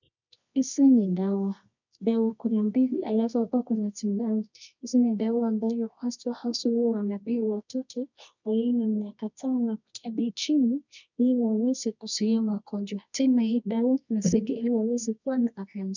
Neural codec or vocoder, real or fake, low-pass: codec, 24 kHz, 0.9 kbps, WavTokenizer, medium music audio release; fake; 7.2 kHz